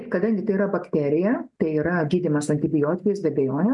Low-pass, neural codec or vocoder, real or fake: 10.8 kHz; none; real